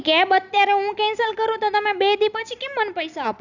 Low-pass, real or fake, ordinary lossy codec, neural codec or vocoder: 7.2 kHz; real; none; none